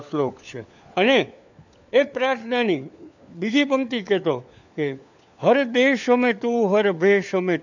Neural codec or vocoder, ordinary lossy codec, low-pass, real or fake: codec, 16 kHz, 4 kbps, FunCodec, trained on LibriTTS, 50 frames a second; none; 7.2 kHz; fake